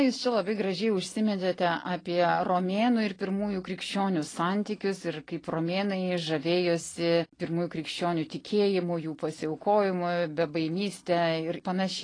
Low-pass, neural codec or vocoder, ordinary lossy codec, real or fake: 9.9 kHz; none; AAC, 32 kbps; real